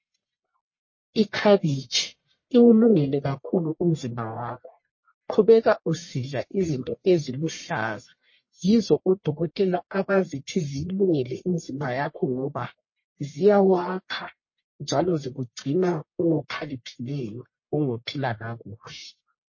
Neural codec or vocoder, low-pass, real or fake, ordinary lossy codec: codec, 44.1 kHz, 1.7 kbps, Pupu-Codec; 7.2 kHz; fake; MP3, 32 kbps